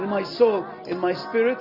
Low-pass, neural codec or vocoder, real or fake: 5.4 kHz; none; real